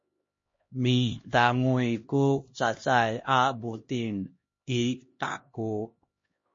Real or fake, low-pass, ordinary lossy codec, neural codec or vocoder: fake; 7.2 kHz; MP3, 32 kbps; codec, 16 kHz, 1 kbps, X-Codec, HuBERT features, trained on LibriSpeech